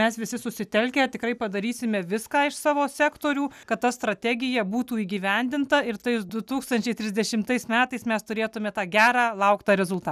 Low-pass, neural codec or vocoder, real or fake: 14.4 kHz; none; real